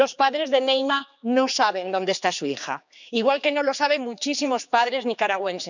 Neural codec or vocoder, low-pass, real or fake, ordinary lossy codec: codec, 16 kHz, 4 kbps, X-Codec, HuBERT features, trained on general audio; 7.2 kHz; fake; none